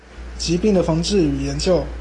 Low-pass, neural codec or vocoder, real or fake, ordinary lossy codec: 10.8 kHz; none; real; AAC, 32 kbps